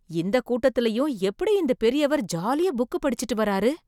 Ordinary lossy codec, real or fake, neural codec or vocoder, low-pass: none; real; none; 19.8 kHz